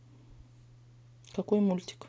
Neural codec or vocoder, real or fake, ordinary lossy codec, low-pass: none; real; none; none